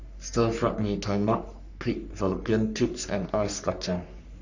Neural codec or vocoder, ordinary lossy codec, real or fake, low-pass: codec, 44.1 kHz, 3.4 kbps, Pupu-Codec; none; fake; 7.2 kHz